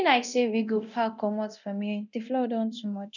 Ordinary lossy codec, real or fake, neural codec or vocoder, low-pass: none; fake; codec, 24 kHz, 0.9 kbps, DualCodec; 7.2 kHz